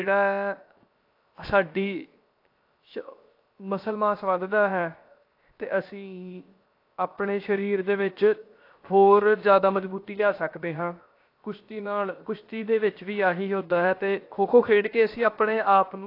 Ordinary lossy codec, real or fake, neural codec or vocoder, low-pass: AAC, 32 kbps; fake; codec, 16 kHz, 0.7 kbps, FocalCodec; 5.4 kHz